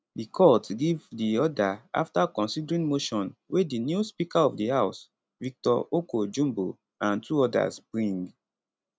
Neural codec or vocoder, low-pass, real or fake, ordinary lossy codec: none; none; real; none